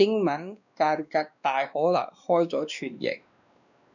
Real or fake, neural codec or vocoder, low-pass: fake; codec, 16 kHz, 2 kbps, X-Codec, WavLM features, trained on Multilingual LibriSpeech; 7.2 kHz